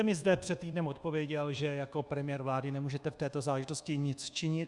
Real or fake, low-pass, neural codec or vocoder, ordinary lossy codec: fake; 10.8 kHz; codec, 24 kHz, 1.2 kbps, DualCodec; Opus, 64 kbps